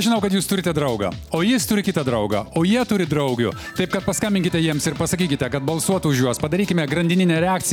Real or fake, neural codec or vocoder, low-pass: real; none; 19.8 kHz